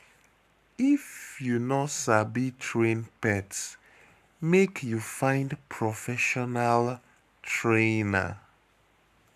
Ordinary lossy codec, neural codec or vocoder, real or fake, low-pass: none; codec, 44.1 kHz, 7.8 kbps, Pupu-Codec; fake; 14.4 kHz